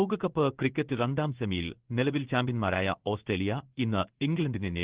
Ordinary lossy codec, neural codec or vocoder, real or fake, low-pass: Opus, 16 kbps; codec, 16 kHz in and 24 kHz out, 1 kbps, XY-Tokenizer; fake; 3.6 kHz